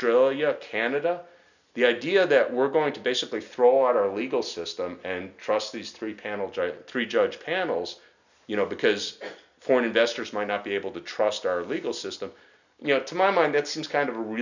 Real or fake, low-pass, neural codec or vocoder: real; 7.2 kHz; none